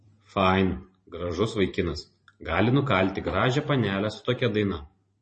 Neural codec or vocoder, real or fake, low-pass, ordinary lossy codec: vocoder, 44.1 kHz, 128 mel bands every 512 samples, BigVGAN v2; fake; 10.8 kHz; MP3, 32 kbps